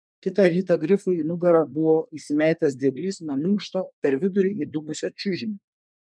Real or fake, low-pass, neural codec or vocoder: fake; 9.9 kHz; codec, 24 kHz, 1 kbps, SNAC